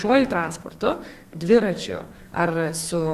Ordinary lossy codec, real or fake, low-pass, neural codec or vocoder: Opus, 64 kbps; fake; 14.4 kHz; codec, 32 kHz, 1.9 kbps, SNAC